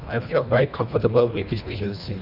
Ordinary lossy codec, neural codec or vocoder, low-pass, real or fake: none; codec, 24 kHz, 1.5 kbps, HILCodec; 5.4 kHz; fake